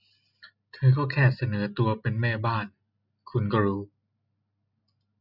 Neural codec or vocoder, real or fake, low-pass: none; real; 5.4 kHz